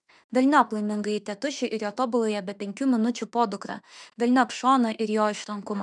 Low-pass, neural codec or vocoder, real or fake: 10.8 kHz; autoencoder, 48 kHz, 32 numbers a frame, DAC-VAE, trained on Japanese speech; fake